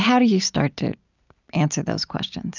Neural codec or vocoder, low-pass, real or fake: none; 7.2 kHz; real